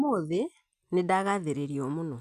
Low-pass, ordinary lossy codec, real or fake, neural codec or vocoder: 14.4 kHz; none; real; none